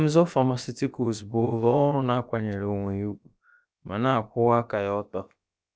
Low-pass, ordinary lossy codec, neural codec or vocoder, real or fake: none; none; codec, 16 kHz, about 1 kbps, DyCAST, with the encoder's durations; fake